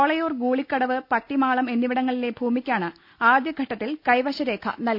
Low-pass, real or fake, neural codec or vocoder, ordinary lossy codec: 5.4 kHz; real; none; none